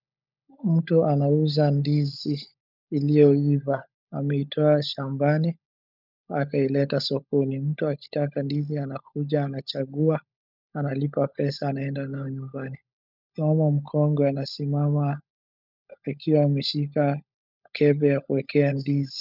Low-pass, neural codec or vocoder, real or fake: 5.4 kHz; codec, 16 kHz, 16 kbps, FunCodec, trained on LibriTTS, 50 frames a second; fake